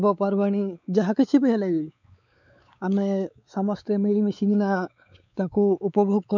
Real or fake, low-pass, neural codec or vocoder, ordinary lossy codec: fake; 7.2 kHz; codec, 16 kHz, 4 kbps, X-Codec, WavLM features, trained on Multilingual LibriSpeech; none